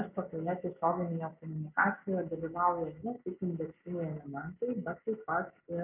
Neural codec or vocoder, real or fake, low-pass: none; real; 3.6 kHz